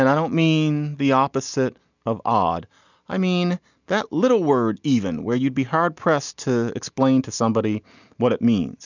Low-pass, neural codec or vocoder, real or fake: 7.2 kHz; none; real